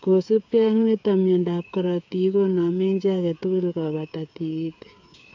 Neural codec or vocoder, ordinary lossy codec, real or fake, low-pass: codec, 16 kHz, 8 kbps, FreqCodec, smaller model; AAC, 48 kbps; fake; 7.2 kHz